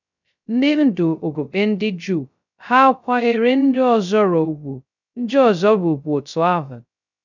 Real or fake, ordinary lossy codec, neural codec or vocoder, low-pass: fake; none; codec, 16 kHz, 0.2 kbps, FocalCodec; 7.2 kHz